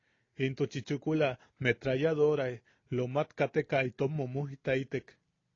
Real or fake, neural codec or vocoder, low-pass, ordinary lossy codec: real; none; 7.2 kHz; AAC, 32 kbps